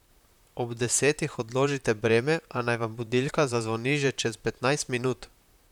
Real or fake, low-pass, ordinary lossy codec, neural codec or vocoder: fake; 19.8 kHz; none; vocoder, 44.1 kHz, 128 mel bands, Pupu-Vocoder